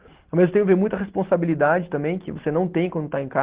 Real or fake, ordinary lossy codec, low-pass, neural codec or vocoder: real; Opus, 16 kbps; 3.6 kHz; none